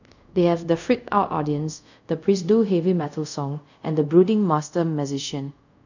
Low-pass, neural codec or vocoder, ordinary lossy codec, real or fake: 7.2 kHz; codec, 24 kHz, 0.5 kbps, DualCodec; none; fake